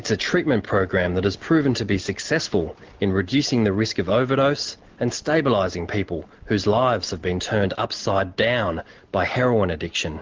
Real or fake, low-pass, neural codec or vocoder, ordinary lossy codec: real; 7.2 kHz; none; Opus, 16 kbps